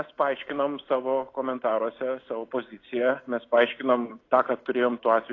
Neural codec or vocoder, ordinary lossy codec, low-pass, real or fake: none; AAC, 32 kbps; 7.2 kHz; real